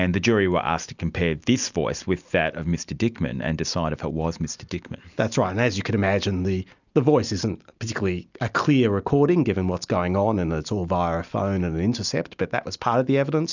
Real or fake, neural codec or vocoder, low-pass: real; none; 7.2 kHz